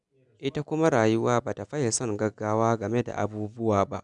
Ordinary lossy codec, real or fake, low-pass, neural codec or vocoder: none; real; 10.8 kHz; none